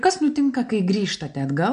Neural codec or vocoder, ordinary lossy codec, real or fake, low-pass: none; AAC, 96 kbps; real; 9.9 kHz